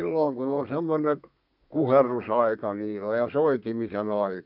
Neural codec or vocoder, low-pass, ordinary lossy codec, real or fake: codec, 32 kHz, 1.9 kbps, SNAC; 5.4 kHz; none; fake